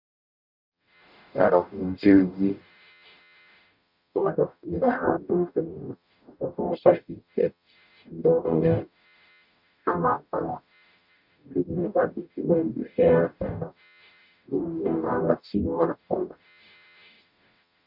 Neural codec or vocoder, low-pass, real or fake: codec, 44.1 kHz, 0.9 kbps, DAC; 5.4 kHz; fake